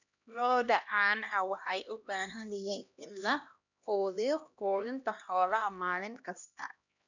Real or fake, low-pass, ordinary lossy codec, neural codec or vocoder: fake; 7.2 kHz; none; codec, 16 kHz, 1 kbps, X-Codec, HuBERT features, trained on LibriSpeech